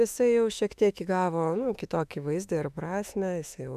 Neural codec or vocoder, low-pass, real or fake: autoencoder, 48 kHz, 32 numbers a frame, DAC-VAE, trained on Japanese speech; 14.4 kHz; fake